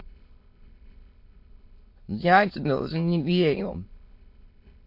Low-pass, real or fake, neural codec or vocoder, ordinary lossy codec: 5.4 kHz; fake; autoencoder, 22.05 kHz, a latent of 192 numbers a frame, VITS, trained on many speakers; MP3, 32 kbps